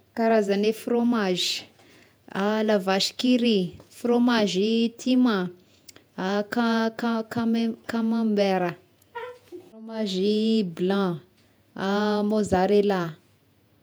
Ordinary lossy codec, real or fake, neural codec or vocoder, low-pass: none; fake; vocoder, 48 kHz, 128 mel bands, Vocos; none